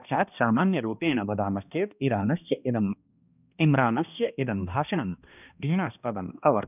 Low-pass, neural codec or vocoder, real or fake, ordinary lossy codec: 3.6 kHz; codec, 16 kHz, 1 kbps, X-Codec, HuBERT features, trained on balanced general audio; fake; none